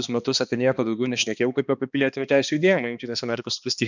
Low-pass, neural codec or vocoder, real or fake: 7.2 kHz; codec, 16 kHz, 2 kbps, X-Codec, HuBERT features, trained on balanced general audio; fake